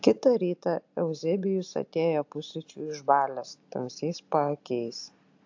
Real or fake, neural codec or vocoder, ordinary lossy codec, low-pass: real; none; AAC, 48 kbps; 7.2 kHz